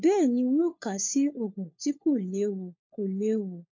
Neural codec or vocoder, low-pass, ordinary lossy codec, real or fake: codec, 16 kHz, 4 kbps, FunCodec, trained on LibriTTS, 50 frames a second; 7.2 kHz; MP3, 48 kbps; fake